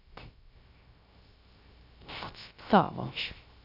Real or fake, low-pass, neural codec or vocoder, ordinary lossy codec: fake; 5.4 kHz; codec, 16 kHz, 0.3 kbps, FocalCodec; none